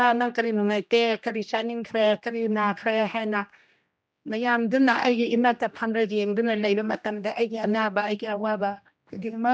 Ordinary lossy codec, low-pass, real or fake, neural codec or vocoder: none; none; fake; codec, 16 kHz, 1 kbps, X-Codec, HuBERT features, trained on general audio